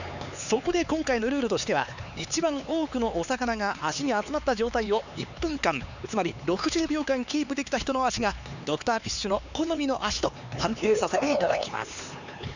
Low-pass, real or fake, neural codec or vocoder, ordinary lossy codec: 7.2 kHz; fake; codec, 16 kHz, 4 kbps, X-Codec, HuBERT features, trained on LibriSpeech; none